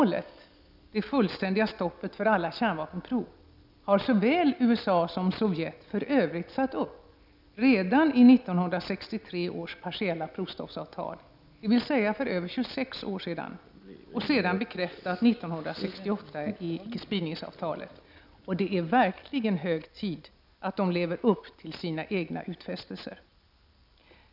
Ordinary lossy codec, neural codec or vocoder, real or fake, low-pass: none; none; real; 5.4 kHz